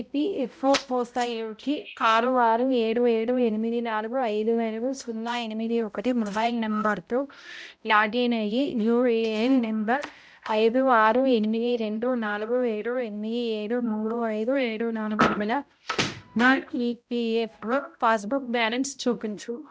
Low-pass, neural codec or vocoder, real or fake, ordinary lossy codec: none; codec, 16 kHz, 0.5 kbps, X-Codec, HuBERT features, trained on balanced general audio; fake; none